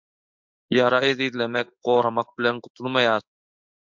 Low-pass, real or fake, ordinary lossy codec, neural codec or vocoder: 7.2 kHz; fake; MP3, 64 kbps; codec, 16 kHz in and 24 kHz out, 1 kbps, XY-Tokenizer